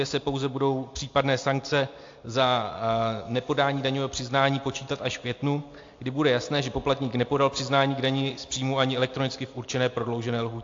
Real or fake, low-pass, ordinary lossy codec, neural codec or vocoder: real; 7.2 kHz; AAC, 48 kbps; none